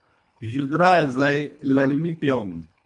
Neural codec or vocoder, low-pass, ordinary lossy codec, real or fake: codec, 24 kHz, 1.5 kbps, HILCodec; 10.8 kHz; MP3, 64 kbps; fake